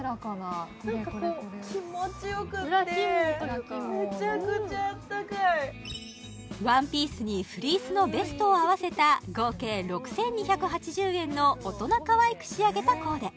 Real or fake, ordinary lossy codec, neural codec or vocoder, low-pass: real; none; none; none